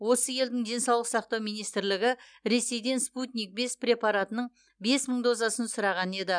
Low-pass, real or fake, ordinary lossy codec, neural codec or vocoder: 9.9 kHz; real; none; none